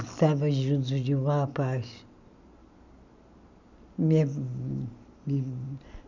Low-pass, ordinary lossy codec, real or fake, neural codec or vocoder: 7.2 kHz; none; real; none